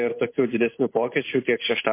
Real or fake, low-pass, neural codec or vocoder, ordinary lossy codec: fake; 3.6 kHz; vocoder, 44.1 kHz, 128 mel bands every 512 samples, BigVGAN v2; MP3, 24 kbps